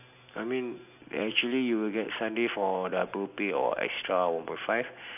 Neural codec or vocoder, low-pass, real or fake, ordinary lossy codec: none; 3.6 kHz; real; none